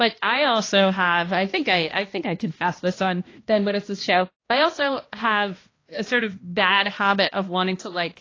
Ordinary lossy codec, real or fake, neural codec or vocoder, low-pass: AAC, 32 kbps; fake; codec, 16 kHz, 1 kbps, X-Codec, HuBERT features, trained on balanced general audio; 7.2 kHz